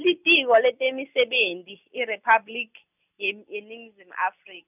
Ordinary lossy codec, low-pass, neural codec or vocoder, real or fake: none; 3.6 kHz; none; real